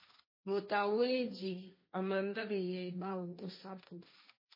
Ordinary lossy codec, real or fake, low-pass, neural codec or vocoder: MP3, 24 kbps; fake; 5.4 kHz; codec, 16 kHz, 1.1 kbps, Voila-Tokenizer